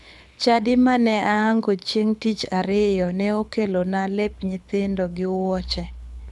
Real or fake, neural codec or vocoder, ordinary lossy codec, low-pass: fake; codec, 24 kHz, 6 kbps, HILCodec; none; none